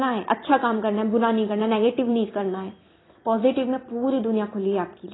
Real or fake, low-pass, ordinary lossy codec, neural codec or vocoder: fake; 7.2 kHz; AAC, 16 kbps; vocoder, 44.1 kHz, 128 mel bands every 256 samples, BigVGAN v2